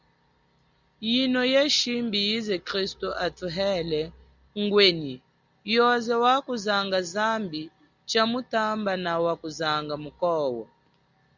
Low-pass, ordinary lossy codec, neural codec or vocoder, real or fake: 7.2 kHz; Opus, 64 kbps; none; real